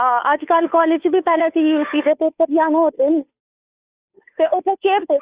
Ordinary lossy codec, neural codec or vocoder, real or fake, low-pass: Opus, 64 kbps; codec, 16 kHz, 2 kbps, FunCodec, trained on Chinese and English, 25 frames a second; fake; 3.6 kHz